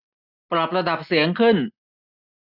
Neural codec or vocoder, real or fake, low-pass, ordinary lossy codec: none; real; 5.4 kHz; none